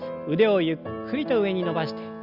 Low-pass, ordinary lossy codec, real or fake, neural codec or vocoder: 5.4 kHz; Opus, 64 kbps; real; none